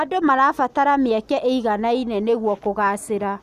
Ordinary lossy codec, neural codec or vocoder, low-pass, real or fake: none; none; 14.4 kHz; real